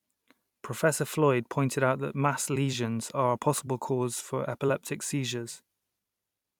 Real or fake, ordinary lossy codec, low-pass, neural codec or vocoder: fake; none; 19.8 kHz; vocoder, 44.1 kHz, 128 mel bands every 256 samples, BigVGAN v2